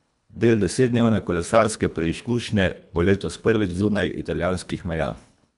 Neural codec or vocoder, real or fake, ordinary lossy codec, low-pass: codec, 24 kHz, 1.5 kbps, HILCodec; fake; none; 10.8 kHz